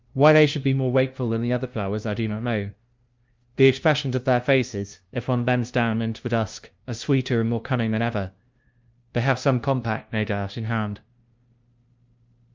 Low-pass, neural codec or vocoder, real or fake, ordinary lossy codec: 7.2 kHz; codec, 16 kHz, 0.5 kbps, FunCodec, trained on LibriTTS, 25 frames a second; fake; Opus, 24 kbps